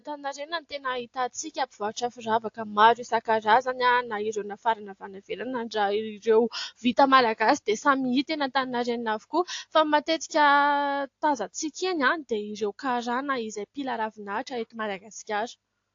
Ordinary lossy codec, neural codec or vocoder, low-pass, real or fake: AAC, 48 kbps; none; 7.2 kHz; real